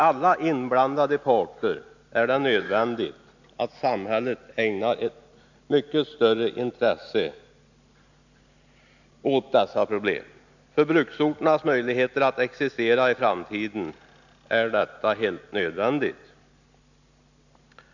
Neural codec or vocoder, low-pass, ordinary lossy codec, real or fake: none; 7.2 kHz; none; real